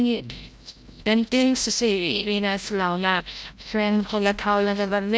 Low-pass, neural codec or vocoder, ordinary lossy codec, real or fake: none; codec, 16 kHz, 0.5 kbps, FreqCodec, larger model; none; fake